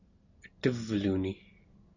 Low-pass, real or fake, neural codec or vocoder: 7.2 kHz; real; none